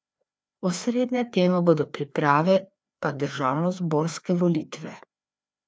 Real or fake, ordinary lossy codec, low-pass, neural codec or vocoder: fake; none; none; codec, 16 kHz, 2 kbps, FreqCodec, larger model